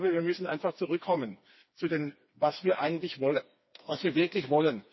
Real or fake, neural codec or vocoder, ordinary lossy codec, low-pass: fake; codec, 16 kHz, 2 kbps, FreqCodec, smaller model; MP3, 24 kbps; 7.2 kHz